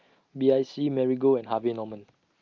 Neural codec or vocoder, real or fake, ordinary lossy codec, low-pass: none; real; Opus, 24 kbps; 7.2 kHz